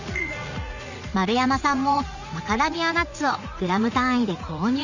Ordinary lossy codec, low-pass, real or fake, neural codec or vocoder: none; 7.2 kHz; fake; vocoder, 44.1 kHz, 80 mel bands, Vocos